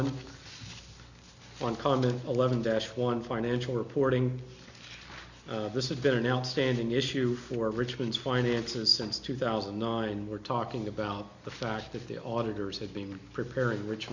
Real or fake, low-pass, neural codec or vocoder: real; 7.2 kHz; none